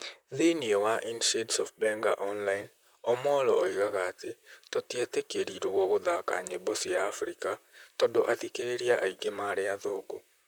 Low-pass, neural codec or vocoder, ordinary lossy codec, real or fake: none; vocoder, 44.1 kHz, 128 mel bands, Pupu-Vocoder; none; fake